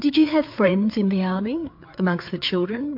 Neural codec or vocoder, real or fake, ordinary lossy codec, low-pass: codec, 16 kHz, 4 kbps, FreqCodec, larger model; fake; MP3, 48 kbps; 5.4 kHz